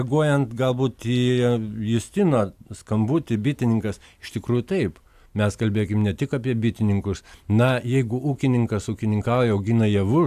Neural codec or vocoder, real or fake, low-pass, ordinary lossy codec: none; real; 14.4 kHz; AAC, 96 kbps